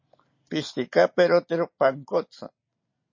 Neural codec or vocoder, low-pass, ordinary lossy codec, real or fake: none; 7.2 kHz; MP3, 32 kbps; real